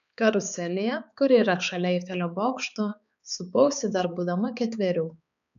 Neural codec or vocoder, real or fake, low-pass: codec, 16 kHz, 4 kbps, X-Codec, HuBERT features, trained on balanced general audio; fake; 7.2 kHz